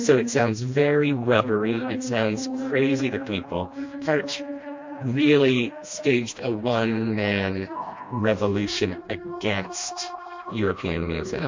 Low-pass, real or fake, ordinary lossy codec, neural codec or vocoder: 7.2 kHz; fake; MP3, 48 kbps; codec, 16 kHz, 1 kbps, FreqCodec, smaller model